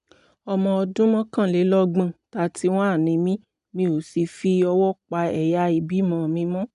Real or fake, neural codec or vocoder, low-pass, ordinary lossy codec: real; none; 10.8 kHz; none